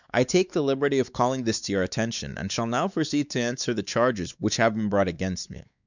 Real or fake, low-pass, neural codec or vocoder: fake; 7.2 kHz; codec, 16 kHz, 4 kbps, X-Codec, WavLM features, trained on Multilingual LibriSpeech